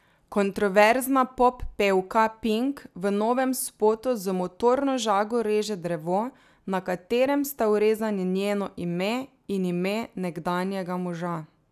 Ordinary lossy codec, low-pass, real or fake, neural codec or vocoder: none; 14.4 kHz; real; none